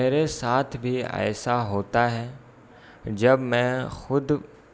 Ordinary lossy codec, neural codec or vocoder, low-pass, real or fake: none; none; none; real